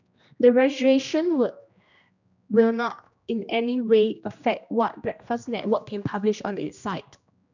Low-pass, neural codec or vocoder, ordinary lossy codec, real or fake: 7.2 kHz; codec, 16 kHz, 1 kbps, X-Codec, HuBERT features, trained on general audio; none; fake